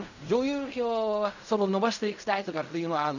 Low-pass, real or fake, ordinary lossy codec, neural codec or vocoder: 7.2 kHz; fake; none; codec, 16 kHz in and 24 kHz out, 0.4 kbps, LongCat-Audio-Codec, fine tuned four codebook decoder